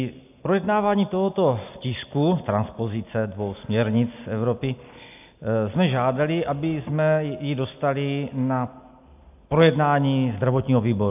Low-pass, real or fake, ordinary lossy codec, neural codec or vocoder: 3.6 kHz; real; AAC, 32 kbps; none